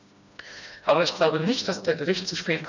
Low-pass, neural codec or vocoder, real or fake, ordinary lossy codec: 7.2 kHz; codec, 16 kHz, 1 kbps, FreqCodec, smaller model; fake; none